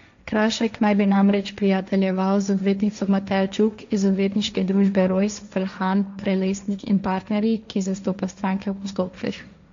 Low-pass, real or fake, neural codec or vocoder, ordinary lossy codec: 7.2 kHz; fake; codec, 16 kHz, 1.1 kbps, Voila-Tokenizer; MP3, 64 kbps